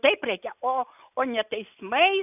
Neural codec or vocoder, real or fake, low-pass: none; real; 3.6 kHz